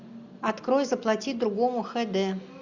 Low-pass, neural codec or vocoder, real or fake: 7.2 kHz; none; real